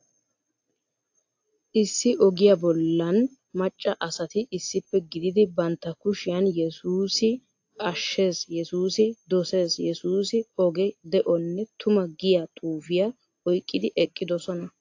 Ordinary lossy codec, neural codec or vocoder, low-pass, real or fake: AAC, 48 kbps; none; 7.2 kHz; real